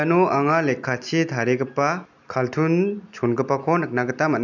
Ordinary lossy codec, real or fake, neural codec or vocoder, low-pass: none; real; none; 7.2 kHz